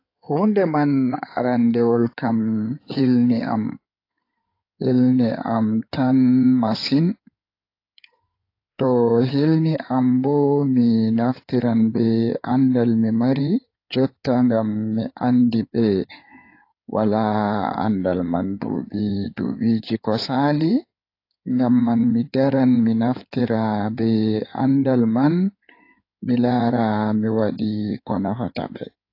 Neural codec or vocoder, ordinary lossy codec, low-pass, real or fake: codec, 16 kHz in and 24 kHz out, 2.2 kbps, FireRedTTS-2 codec; AAC, 32 kbps; 5.4 kHz; fake